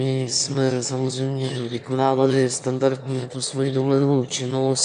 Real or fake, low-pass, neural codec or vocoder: fake; 9.9 kHz; autoencoder, 22.05 kHz, a latent of 192 numbers a frame, VITS, trained on one speaker